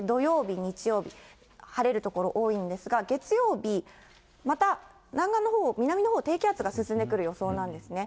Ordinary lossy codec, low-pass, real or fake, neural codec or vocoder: none; none; real; none